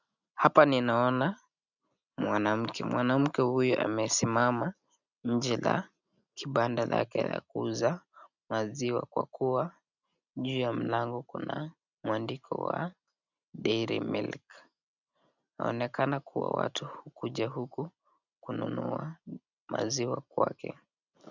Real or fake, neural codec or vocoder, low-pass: real; none; 7.2 kHz